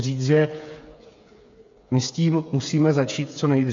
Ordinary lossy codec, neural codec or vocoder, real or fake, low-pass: MP3, 48 kbps; codec, 16 kHz, 8 kbps, FreqCodec, smaller model; fake; 7.2 kHz